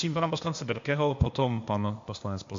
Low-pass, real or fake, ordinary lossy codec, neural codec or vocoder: 7.2 kHz; fake; MP3, 48 kbps; codec, 16 kHz, 0.8 kbps, ZipCodec